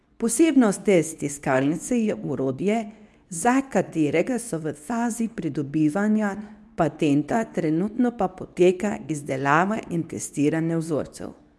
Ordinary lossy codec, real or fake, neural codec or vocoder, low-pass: none; fake; codec, 24 kHz, 0.9 kbps, WavTokenizer, medium speech release version 2; none